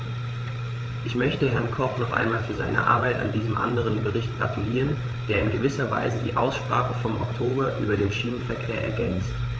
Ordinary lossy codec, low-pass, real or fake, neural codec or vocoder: none; none; fake; codec, 16 kHz, 8 kbps, FreqCodec, larger model